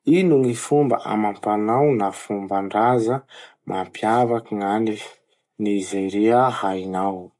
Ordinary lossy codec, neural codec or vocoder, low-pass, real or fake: MP3, 64 kbps; none; 10.8 kHz; real